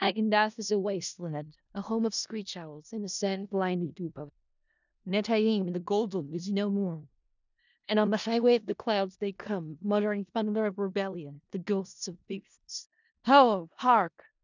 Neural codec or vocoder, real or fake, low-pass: codec, 16 kHz in and 24 kHz out, 0.4 kbps, LongCat-Audio-Codec, four codebook decoder; fake; 7.2 kHz